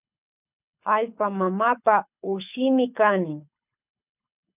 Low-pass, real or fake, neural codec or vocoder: 3.6 kHz; fake; codec, 24 kHz, 6 kbps, HILCodec